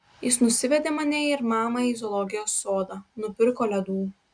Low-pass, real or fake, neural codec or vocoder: 9.9 kHz; real; none